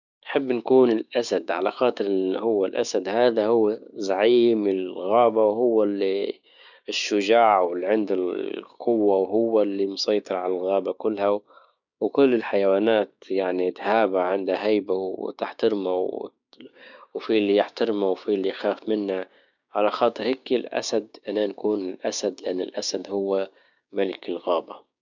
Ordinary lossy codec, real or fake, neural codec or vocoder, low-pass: none; fake; codec, 16 kHz, 6 kbps, DAC; 7.2 kHz